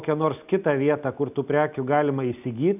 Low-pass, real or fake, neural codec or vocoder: 3.6 kHz; real; none